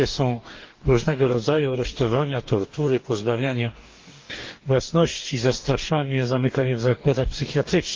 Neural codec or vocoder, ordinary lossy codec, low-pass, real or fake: codec, 44.1 kHz, 2.6 kbps, DAC; Opus, 32 kbps; 7.2 kHz; fake